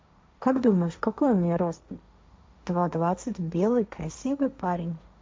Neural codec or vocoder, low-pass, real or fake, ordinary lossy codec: codec, 16 kHz, 1.1 kbps, Voila-Tokenizer; none; fake; none